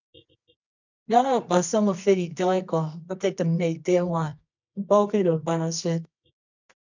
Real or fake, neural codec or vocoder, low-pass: fake; codec, 24 kHz, 0.9 kbps, WavTokenizer, medium music audio release; 7.2 kHz